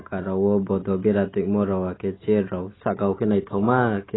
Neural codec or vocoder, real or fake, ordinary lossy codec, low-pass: none; real; AAC, 16 kbps; 7.2 kHz